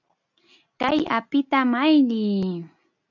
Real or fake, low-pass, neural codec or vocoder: real; 7.2 kHz; none